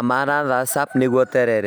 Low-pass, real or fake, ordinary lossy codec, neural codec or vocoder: none; real; none; none